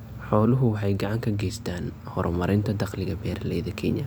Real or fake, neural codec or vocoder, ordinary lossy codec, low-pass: real; none; none; none